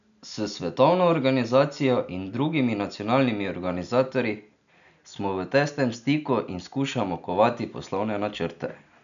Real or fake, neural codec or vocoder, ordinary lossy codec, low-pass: real; none; none; 7.2 kHz